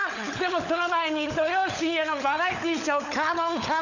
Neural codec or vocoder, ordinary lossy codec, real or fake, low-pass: codec, 16 kHz, 16 kbps, FunCodec, trained on LibriTTS, 50 frames a second; none; fake; 7.2 kHz